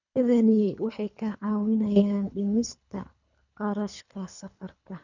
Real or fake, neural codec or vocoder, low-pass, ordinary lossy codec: fake; codec, 24 kHz, 3 kbps, HILCodec; 7.2 kHz; none